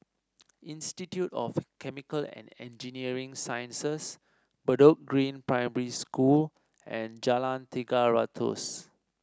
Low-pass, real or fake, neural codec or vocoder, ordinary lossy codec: none; real; none; none